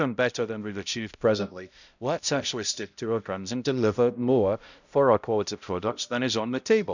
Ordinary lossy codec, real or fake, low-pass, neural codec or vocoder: none; fake; 7.2 kHz; codec, 16 kHz, 0.5 kbps, X-Codec, HuBERT features, trained on balanced general audio